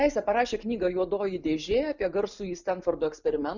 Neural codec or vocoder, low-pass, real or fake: none; 7.2 kHz; real